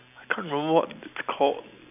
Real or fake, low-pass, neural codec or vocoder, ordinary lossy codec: real; 3.6 kHz; none; none